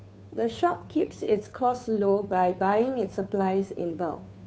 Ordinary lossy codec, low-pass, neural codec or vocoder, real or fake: none; none; codec, 16 kHz, 2 kbps, FunCodec, trained on Chinese and English, 25 frames a second; fake